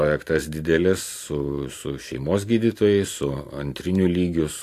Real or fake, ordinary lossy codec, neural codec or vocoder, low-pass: real; AAC, 64 kbps; none; 14.4 kHz